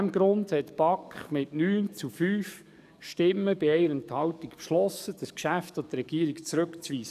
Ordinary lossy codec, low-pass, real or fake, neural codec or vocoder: none; 14.4 kHz; fake; codec, 44.1 kHz, 7.8 kbps, DAC